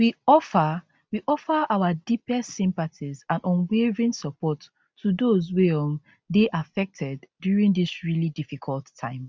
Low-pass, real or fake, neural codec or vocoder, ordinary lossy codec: none; real; none; none